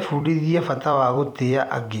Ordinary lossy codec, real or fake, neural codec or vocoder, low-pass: none; fake; vocoder, 44.1 kHz, 128 mel bands every 256 samples, BigVGAN v2; 19.8 kHz